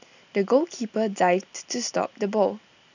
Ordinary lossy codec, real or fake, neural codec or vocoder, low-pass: none; real; none; 7.2 kHz